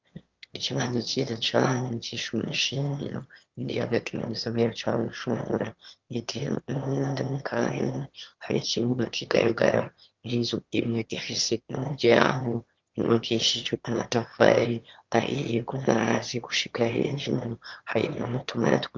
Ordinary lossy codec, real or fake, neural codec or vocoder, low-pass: Opus, 24 kbps; fake; autoencoder, 22.05 kHz, a latent of 192 numbers a frame, VITS, trained on one speaker; 7.2 kHz